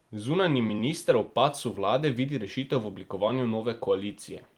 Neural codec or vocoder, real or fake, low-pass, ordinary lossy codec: vocoder, 44.1 kHz, 128 mel bands every 256 samples, BigVGAN v2; fake; 19.8 kHz; Opus, 24 kbps